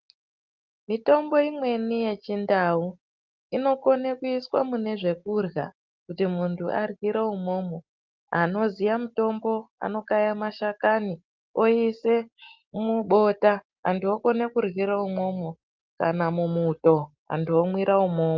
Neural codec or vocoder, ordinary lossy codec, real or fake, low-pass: none; Opus, 32 kbps; real; 7.2 kHz